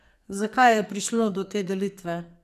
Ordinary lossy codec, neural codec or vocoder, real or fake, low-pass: none; codec, 44.1 kHz, 2.6 kbps, SNAC; fake; 14.4 kHz